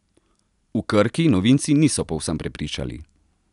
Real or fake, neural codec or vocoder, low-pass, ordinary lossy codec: real; none; 10.8 kHz; none